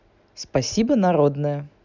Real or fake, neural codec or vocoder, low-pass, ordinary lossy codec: real; none; 7.2 kHz; none